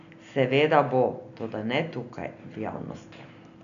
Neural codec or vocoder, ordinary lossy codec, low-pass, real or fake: none; none; 7.2 kHz; real